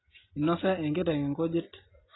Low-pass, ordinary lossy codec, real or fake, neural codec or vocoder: 7.2 kHz; AAC, 16 kbps; real; none